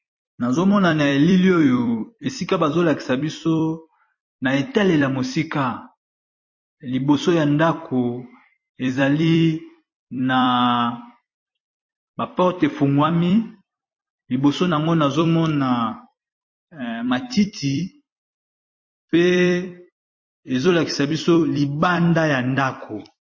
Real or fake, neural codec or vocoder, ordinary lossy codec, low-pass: fake; vocoder, 44.1 kHz, 128 mel bands every 256 samples, BigVGAN v2; MP3, 32 kbps; 7.2 kHz